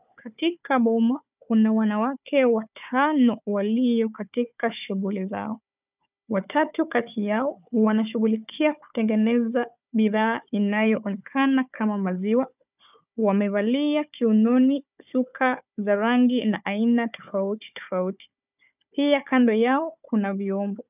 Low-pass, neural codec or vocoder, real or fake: 3.6 kHz; codec, 16 kHz, 4 kbps, FunCodec, trained on Chinese and English, 50 frames a second; fake